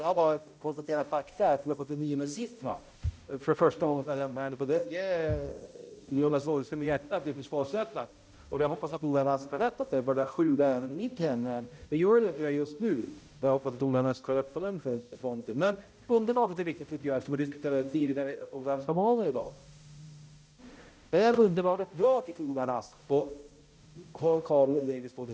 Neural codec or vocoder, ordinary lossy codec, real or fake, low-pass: codec, 16 kHz, 0.5 kbps, X-Codec, HuBERT features, trained on balanced general audio; none; fake; none